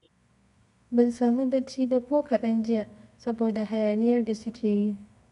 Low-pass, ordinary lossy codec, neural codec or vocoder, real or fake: 10.8 kHz; none; codec, 24 kHz, 0.9 kbps, WavTokenizer, medium music audio release; fake